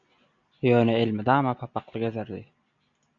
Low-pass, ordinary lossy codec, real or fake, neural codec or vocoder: 7.2 kHz; MP3, 96 kbps; real; none